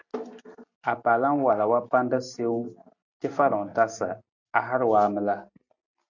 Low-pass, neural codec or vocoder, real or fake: 7.2 kHz; none; real